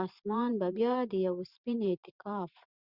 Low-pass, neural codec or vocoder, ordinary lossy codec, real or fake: 5.4 kHz; vocoder, 44.1 kHz, 128 mel bands every 512 samples, BigVGAN v2; Opus, 64 kbps; fake